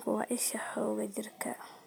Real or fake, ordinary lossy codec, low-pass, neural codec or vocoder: fake; none; none; vocoder, 44.1 kHz, 128 mel bands every 256 samples, BigVGAN v2